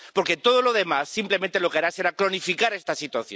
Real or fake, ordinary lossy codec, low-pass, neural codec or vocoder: real; none; none; none